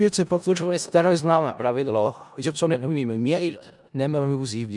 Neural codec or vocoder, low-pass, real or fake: codec, 16 kHz in and 24 kHz out, 0.4 kbps, LongCat-Audio-Codec, four codebook decoder; 10.8 kHz; fake